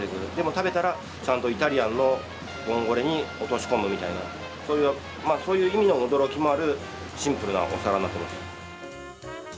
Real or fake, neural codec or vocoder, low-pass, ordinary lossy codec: real; none; none; none